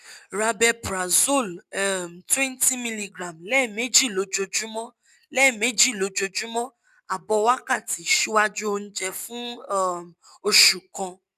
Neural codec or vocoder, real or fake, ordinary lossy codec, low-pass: none; real; none; 14.4 kHz